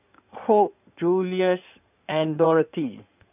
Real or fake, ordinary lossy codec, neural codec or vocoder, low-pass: fake; none; codec, 16 kHz in and 24 kHz out, 2.2 kbps, FireRedTTS-2 codec; 3.6 kHz